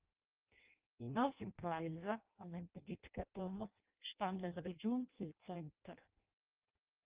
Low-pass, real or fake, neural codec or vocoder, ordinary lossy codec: 3.6 kHz; fake; codec, 16 kHz in and 24 kHz out, 0.6 kbps, FireRedTTS-2 codec; Opus, 24 kbps